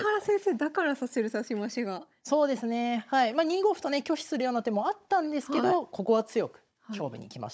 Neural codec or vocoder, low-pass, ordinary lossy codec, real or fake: codec, 16 kHz, 16 kbps, FunCodec, trained on Chinese and English, 50 frames a second; none; none; fake